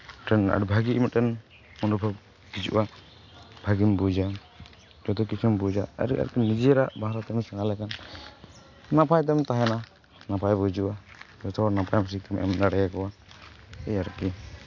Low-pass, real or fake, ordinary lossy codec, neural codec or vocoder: 7.2 kHz; real; AAC, 48 kbps; none